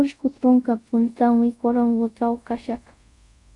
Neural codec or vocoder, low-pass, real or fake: codec, 24 kHz, 0.5 kbps, DualCodec; 10.8 kHz; fake